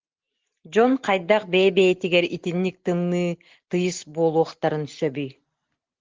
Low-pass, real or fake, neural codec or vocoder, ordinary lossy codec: 7.2 kHz; real; none; Opus, 16 kbps